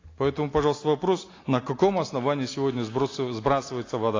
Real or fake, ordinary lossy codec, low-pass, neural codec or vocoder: real; AAC, 32 kbps; 7.2 kHz; none